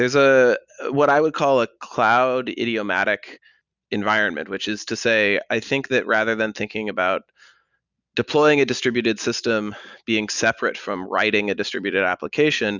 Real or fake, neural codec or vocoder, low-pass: real; none; 7.2 kHz